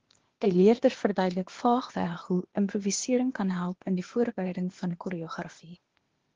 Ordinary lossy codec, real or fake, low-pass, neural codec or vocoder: Opus, 16 kbps; fake; 7.2 kHz; codec, 16 kHz, 0.8 kbps, ZipCodec